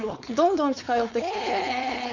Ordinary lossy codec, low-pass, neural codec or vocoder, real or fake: none; 7.2 kHz; codec, 16 kHz, 4.8 kbps, FACodec; fake